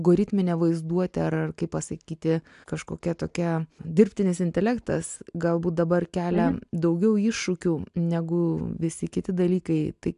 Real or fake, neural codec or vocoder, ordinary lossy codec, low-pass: real; none; AAC, 96 kbps; 10.8 kHz